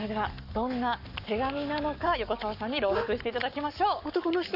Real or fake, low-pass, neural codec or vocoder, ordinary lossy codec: fake; 5.4 kHz; codec, 44.1 kHz, 7.8 kbps, Pupu-Codec; none